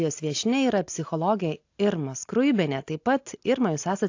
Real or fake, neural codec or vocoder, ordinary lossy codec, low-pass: real; none; AAC, 48 kbps; 7.2 kHz